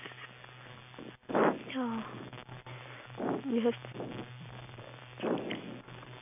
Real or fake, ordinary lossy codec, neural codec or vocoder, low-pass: real; none; none; 3.6 kHz